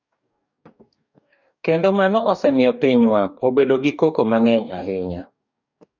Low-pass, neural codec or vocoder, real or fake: 7.2 kHz; codec, 44.1 kHz, 2.6 kbps, DAC; fake